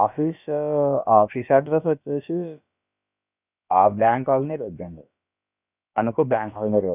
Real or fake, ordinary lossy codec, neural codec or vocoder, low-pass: fake; none; codec, 16 kHz, about 1 kbps, DyCAST, with the encoder's durations; 3.6 kHz